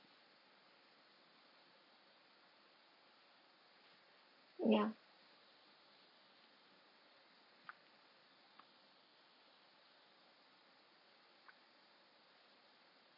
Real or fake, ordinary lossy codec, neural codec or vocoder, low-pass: real; none; none; 5.4 kHz